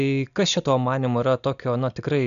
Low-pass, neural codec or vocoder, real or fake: 7.2 kHz; none; real